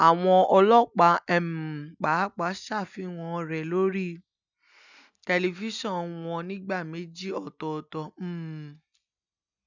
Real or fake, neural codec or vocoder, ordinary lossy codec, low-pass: real; none; none; 7.2 kHz